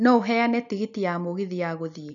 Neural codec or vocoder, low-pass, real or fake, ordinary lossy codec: none; 7.2 kHz; real; none